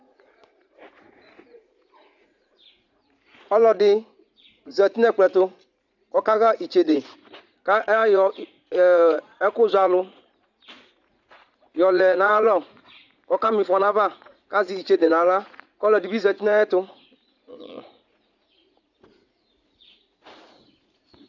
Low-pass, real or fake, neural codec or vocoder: 7.2 kHz; fake; vocoder, 44.1 kHz, 80 mel bands, Vocos